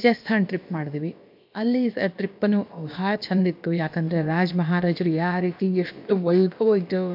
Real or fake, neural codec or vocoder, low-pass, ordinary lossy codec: fake; codec, 16 kHz, about 1 kbps, DyCAST, with the encoder's durations; 5.4 kHz; MP3, 48 kbps